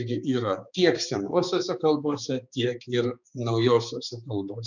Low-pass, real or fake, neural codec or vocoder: 7.2 kHz; fake; codec, 16 kHz, 4 kbps, X-Codec, HuBERT features, trained on balanced general audio